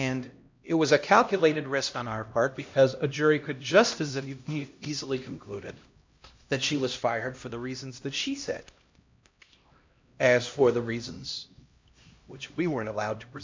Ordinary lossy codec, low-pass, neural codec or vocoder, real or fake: MP3, 64 kbps; 7.2 kHz; codec, 16 kHz, 1 kbps, X-Codec, HuBERT features, trained on LibriSpeech; fake